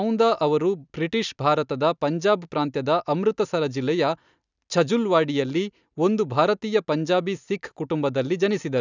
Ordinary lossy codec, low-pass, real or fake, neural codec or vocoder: none; 7.2 kHz; real; none